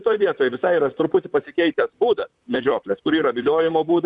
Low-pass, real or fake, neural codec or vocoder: 10.8 kHz; fake; vocoder, 44.1 kHz, 128 mel bands, Pupu-Vocoder